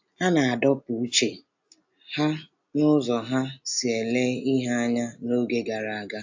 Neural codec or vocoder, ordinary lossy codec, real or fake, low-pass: none; AAC, 48 kbps; real; 7.2 kHz